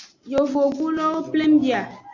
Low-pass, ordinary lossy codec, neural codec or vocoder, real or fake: 7.2 kHz; AAC, 48 kbps; none; real